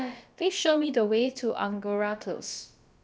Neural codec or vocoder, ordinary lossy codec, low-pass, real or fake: codec, 16 kHz, about 1 kbps, DyCAST, with the encoder's durations; none; none; fake